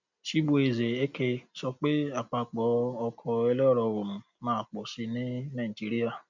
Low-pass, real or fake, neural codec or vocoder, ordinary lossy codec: 7.2 kHz; real; none; none